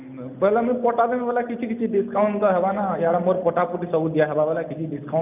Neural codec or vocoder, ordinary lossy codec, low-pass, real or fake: none; none; 3.6 kHz; real